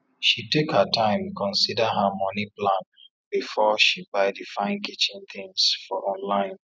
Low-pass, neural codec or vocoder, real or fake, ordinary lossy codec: none; none; real; none